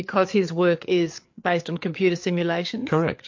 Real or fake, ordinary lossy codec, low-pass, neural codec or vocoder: fake; MP3, 48 kbps; 7.2 kHz; codec, 16 kHz, 4 kbps, FreqCodec, larger model